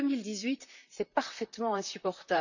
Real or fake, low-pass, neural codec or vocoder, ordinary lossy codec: fake; 7.2 kHz; vocoder, 44.1 kHz, 128 mel bands, Pupu-Vocoder; none